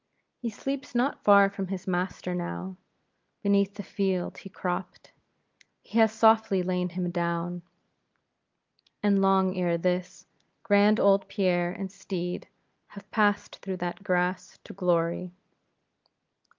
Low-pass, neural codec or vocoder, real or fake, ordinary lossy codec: 7.2 kHz; none; real; Opus, 24 kbps